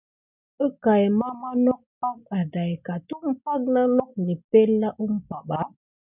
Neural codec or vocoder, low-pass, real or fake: none; 3.6 kHz; real